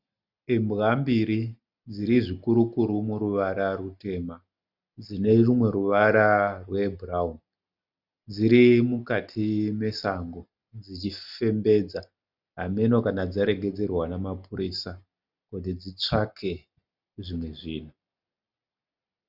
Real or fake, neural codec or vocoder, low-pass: real; none; 5.4 kHz